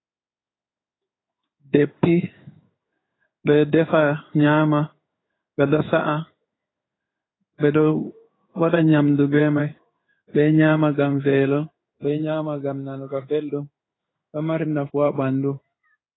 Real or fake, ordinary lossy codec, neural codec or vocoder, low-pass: fake; AAC, 16 kbps; codec, 16 kHz in and 24 kHz out, 1 kbps, XY-Tokenizer; 7.2 kHz